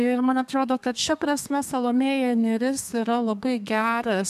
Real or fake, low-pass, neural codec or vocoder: fake; 14.4 kHz; codec, 32 kHz, 1.9 kbps, SNAC